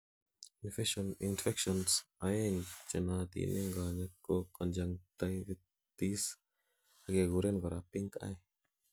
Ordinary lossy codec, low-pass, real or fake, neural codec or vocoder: none; none; real; none